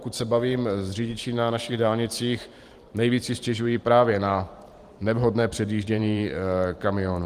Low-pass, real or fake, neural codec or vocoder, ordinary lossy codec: 14.4 kHz; fake; vocoder, 44.1 kHz, 128 mel bands every 512 samples, BigVGAN v2; Opus, 24 kbps